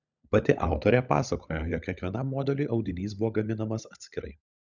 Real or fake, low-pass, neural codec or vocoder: fake; 7.2 kHz; codec, 16 kHz, 16 kbps, FunCodec, trained on LibriTTS, 50 frames a second